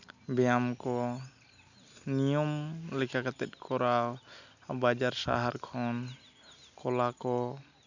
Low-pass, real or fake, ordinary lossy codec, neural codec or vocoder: 7.2 kHz; real; none; none